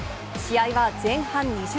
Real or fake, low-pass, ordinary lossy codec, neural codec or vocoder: real; none; none; none